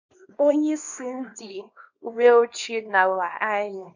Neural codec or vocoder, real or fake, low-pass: codec, 24 kHz, 0.9 kbps, WavTokenizer, small release; fake; 7.2 kHz